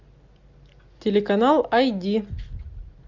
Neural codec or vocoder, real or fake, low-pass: none; real; 7.2 kHz